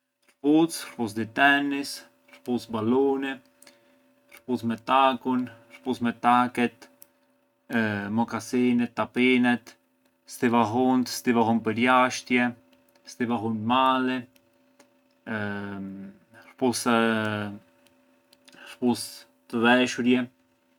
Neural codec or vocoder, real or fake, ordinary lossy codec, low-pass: none; real; none; 19.8 kHz